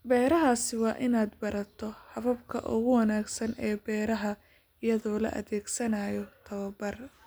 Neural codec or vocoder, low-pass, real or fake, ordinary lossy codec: none; none; real; none